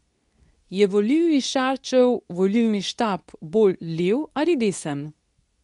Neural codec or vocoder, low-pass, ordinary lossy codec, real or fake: codec, 24 kHz, 0.9 kbps, WavTokenizer, medium speech release version 2; 10.8 kHz; none; fake